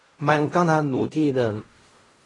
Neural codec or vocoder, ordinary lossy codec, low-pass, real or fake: codec, 16 kHz in and 24 kHz out, 0.4 kbps, LongCat-Audio-Codec, fine tuned four codebook decoder; AAC, 32 kbps; 10.8 kHz; fake